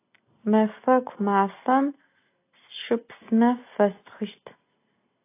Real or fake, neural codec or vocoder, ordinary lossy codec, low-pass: real; none; AAC, 24 kbps; 3.6 kHz